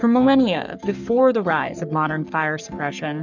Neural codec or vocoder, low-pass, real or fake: codec, 44.1 kHz, 3.4 kbps, Pupu-Codec; 7.2 kHz; fake